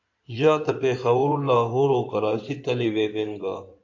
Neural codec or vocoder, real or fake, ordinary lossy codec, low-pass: codec, 16 kHz in and 24 kHz out, 2.2 kbps, FireRedTTS-2 codec; fake; AAC, 48 kbps; 7.2 kHz